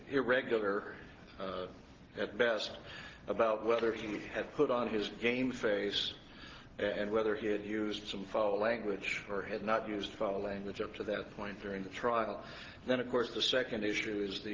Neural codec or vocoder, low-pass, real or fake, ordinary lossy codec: none; 7.2 kHz; real; Opus, 16 kbps